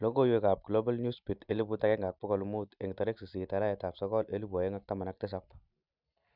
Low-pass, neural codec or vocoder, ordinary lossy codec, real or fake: 5.4 kHz; none; none; real